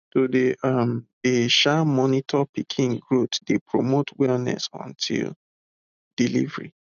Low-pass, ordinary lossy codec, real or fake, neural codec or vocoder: 7.2 kHz; none; real; none